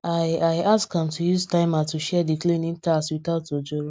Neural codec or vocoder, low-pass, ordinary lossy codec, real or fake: none; none; none; real